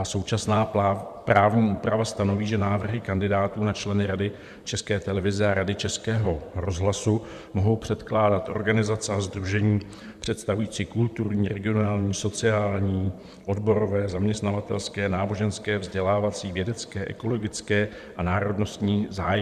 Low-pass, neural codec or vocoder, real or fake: 14.4 kHz; vocoder, 44.1 kHz, 128 mel bands, Pupu-Vocoder; fake